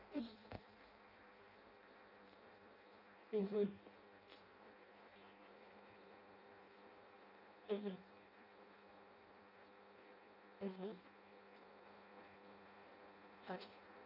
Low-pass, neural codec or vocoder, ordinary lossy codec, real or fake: 5.4 kHz; codec, 16 kHz in and 24 kHz out, 0.6 kbps, FireRedTTS-2 codec; none; fake